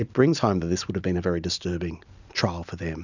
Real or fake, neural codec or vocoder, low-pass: real; none; 7.2 kHz